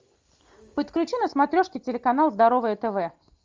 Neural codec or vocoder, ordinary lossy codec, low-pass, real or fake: none; Opus, 32 kbps; 7.2 kHz; real